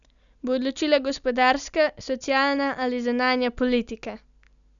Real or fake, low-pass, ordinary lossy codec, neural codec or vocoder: real; 7.2 kHz; none; none